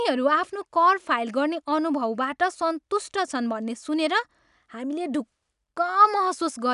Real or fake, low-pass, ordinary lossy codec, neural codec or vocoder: real; 10.8 kHz; none; none